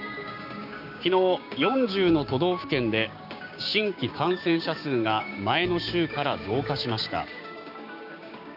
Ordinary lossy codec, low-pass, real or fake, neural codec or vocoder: none; 5.4 kHz; fake; codec, 16 kHz, 6 kbps, DAC